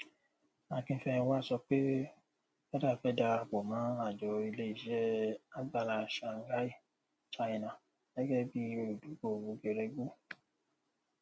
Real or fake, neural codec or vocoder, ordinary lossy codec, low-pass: real; none; none; none